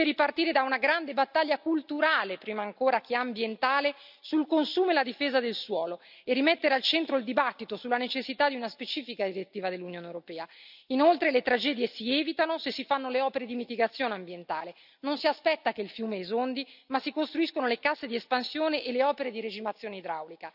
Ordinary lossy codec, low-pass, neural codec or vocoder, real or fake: none; 5.4 kHz; none; real